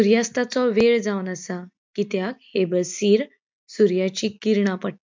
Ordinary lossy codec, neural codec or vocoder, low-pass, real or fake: MP3, 64 kbps; none; 7.2 kHz; real